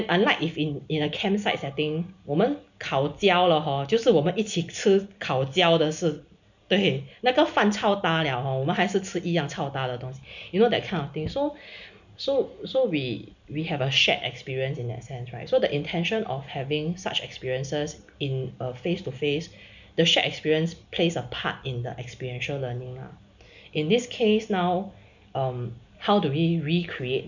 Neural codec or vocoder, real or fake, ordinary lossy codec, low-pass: none; real; none; 7.2 kHz